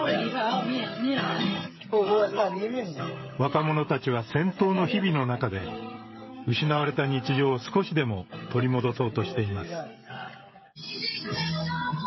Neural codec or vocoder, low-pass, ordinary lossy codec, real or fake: codec, 16 kHz, 16 kbps, FreqCodec, smaller model; 7.2 kHz; MP3, 24 kbps; fake